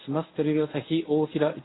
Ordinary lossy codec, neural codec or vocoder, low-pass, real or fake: AAC, 16 kbps; codec, 24 kHz, 0.9 kbps, WavTokenizer, medium speech release version 1; 7.2 kHz; fake